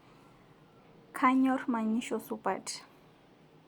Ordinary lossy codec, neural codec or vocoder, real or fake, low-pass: Opus, 64 kbps; none; real; 19.8 kHz